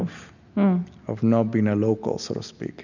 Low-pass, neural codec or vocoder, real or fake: 7.2 kHz; none; real